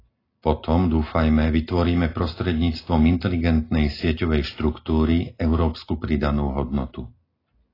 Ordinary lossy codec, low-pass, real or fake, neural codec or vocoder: AAC, 24 kbps; 5.4 kHz; real; none